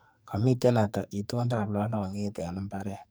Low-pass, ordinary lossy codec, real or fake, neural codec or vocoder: none; none; fake; codec, 44.1 kHz, 2.6 kbps, SNAC